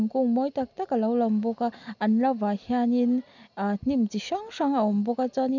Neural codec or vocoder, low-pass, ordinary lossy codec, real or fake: none; 7.2 kHz; none; real